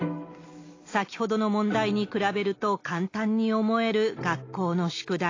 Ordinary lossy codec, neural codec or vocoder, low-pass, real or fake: AAC, 32 kbps; none; 7.2 kHz; real